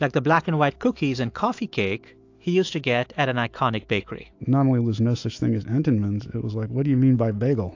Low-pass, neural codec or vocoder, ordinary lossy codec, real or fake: 7.2 kHz; autoencoder, 48 kHz, 128 numbers a frame, DAC-VAE, trained on Japanese speech; AAC, 48 kbps; fake